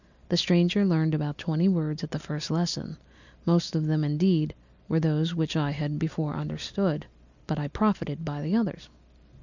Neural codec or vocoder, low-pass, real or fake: none; 7.2 kHz; real